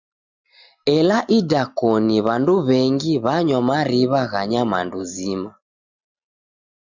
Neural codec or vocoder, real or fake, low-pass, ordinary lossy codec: none; real; 7.2 kHz; Opus, 64 kbps